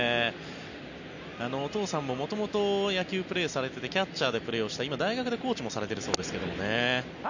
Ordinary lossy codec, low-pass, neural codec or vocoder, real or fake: none; 7.2 kHz; none; real